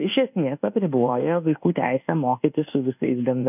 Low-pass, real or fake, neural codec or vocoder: 3.6 kHz; fake; codec, 24 kHz, 1.2 kbps, DualCodec